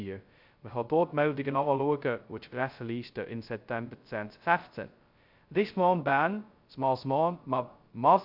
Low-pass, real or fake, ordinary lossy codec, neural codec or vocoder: 5.4 kHz; fake; Opus, 64 kbps; codec, 16 kHz, 0.2 kbps, FocalCodec